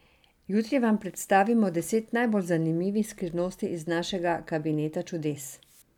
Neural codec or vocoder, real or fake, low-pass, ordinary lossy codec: none; real; 19.8 kHz; none